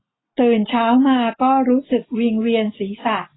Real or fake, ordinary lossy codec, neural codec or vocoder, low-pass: real; AAC, 16 kbps; none; 7.2 kHz